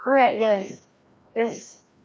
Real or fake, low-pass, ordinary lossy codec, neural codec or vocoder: fake; none; none; codec, 16 kHz, 1 kbps, FreqCodec, larger model